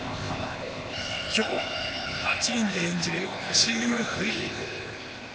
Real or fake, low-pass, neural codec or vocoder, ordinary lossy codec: fake; none; codec, 16 kHz, 0.8 kbps, ZipCodec; none